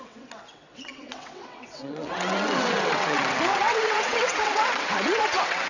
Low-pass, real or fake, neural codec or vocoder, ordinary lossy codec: 7.2 kHz; fake; vocoder, 22.05 kHz, 80 mel bands, WaveNeXt; none